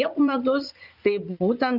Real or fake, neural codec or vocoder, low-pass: fake; vocoder, 44.1 kHz, 80 mel bands, Vocos; 5.4 kHz